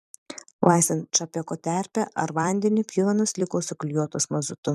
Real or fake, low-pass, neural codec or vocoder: fake; 14.4 kHz; vocoder, 44.1 kHz, 128 mel bands every 256 samples, BigVGAN v2